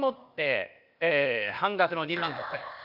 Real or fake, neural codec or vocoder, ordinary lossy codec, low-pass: fake; codec, 16 kHz, 0.8 kbps, ZipCodec; none; 5.4 kHz